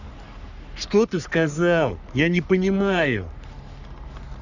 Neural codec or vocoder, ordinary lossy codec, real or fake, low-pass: codec, 44.1 kHz, 3.4 kbps, Pupu-Codec; none; fake; 7.2 kHz